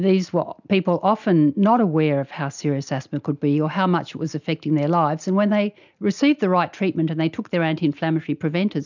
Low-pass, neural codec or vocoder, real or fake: 7.2 kHz; none; real